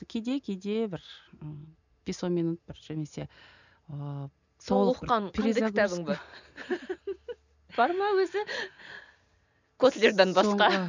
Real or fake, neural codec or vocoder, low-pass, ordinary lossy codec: real; none; 7.2 kHz; none